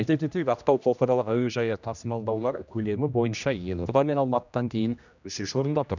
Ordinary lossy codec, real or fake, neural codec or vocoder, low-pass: none; fake; codec, 16 kHz, 1 kbps, X-Codec, HuBERT features, trained on general audio; 7.2 kHz